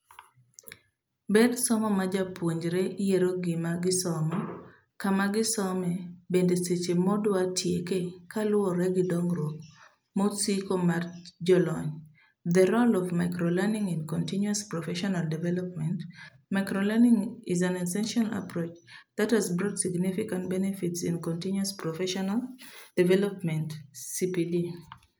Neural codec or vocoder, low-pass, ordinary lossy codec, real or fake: none; none; none; real